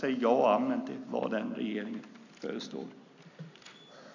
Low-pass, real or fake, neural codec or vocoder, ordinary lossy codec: 7.2 kHz; real; none; none